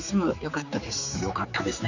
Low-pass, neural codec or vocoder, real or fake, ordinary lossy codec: 7.2 kHz; codec, 16 kHz, 4 kbps, X-Codec, HuBERT features, trained on general audio; fake; none